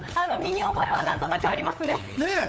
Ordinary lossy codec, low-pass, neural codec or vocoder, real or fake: none; none; codec, 16 kHz, 8 kbps, FunCodec, trained on LibriTTS, 25 frames a second; fake